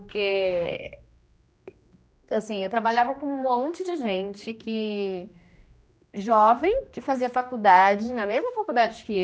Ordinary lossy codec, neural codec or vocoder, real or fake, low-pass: none; codec, 16 kHz, 1 kbps, X-Codec, HuBERT features, trained on general audio; fake; none